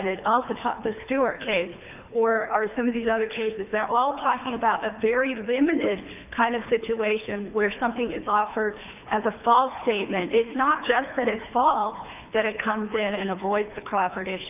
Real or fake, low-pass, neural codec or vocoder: fake; 3.6 kHz; codec, 24 kHz, 3 kbps, HILCodec